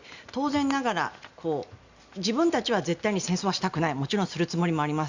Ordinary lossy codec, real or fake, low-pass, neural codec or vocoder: Opus, 64 kbps; real; 7.2 kHz; none